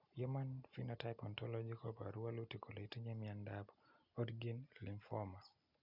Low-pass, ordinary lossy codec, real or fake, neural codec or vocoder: 5.4 kHz; none; real; none